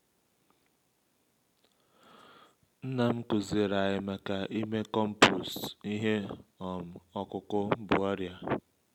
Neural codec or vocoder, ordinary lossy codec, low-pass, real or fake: none; none; 19.8 kHz; real